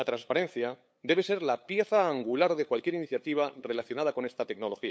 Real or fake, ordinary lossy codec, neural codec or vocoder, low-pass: fake; none; codec, 16 kHz, 8 kbps, FunCodec, trained on LibriTTS, 25 frames a second; none